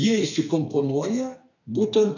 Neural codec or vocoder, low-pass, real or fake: codec, 32 kHz, 1.9 kbps, SNAC; 7.2 kHz; fake